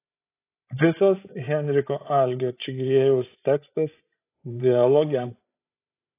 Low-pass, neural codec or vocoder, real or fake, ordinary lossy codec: 3.6 kHz; codec, 16 kHz, 16 kbps, FreqCodec, larger model; fake; AAC, 24 kbps